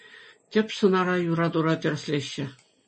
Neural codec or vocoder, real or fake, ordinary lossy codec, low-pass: none; real; MP3, 32 kbps; 9.9 kHz